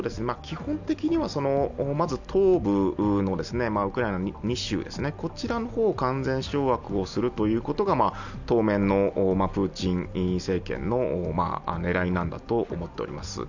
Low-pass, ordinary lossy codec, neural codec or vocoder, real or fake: 7.2 kHz; none; none; real